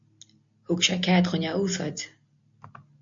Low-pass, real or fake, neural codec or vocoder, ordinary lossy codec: 7.2 kHz; real; none; MP3, 48 kbps